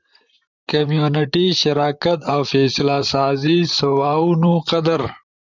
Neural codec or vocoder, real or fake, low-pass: vocoder, 44.1 kHz, 128 mel bands, Pupu-Vocoder; fake; 7.2 kHz